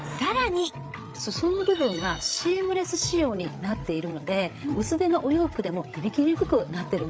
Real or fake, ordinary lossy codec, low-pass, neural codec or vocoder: fake; none; none; codec, 16 kHz, 8 kbps, FreqCodec, larger model